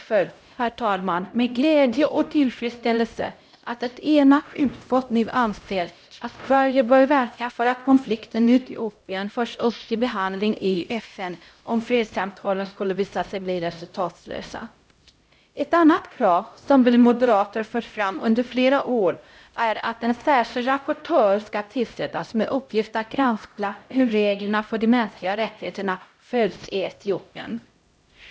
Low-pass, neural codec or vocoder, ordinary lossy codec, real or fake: none; codec, 16 kHz, 0.5 kbps, X-Codec, HuBERT features, trained on LibriSpeech; none; fake